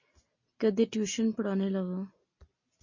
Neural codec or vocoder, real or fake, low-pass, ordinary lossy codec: none; real; 7.2 kHz; MP3, 32 kbps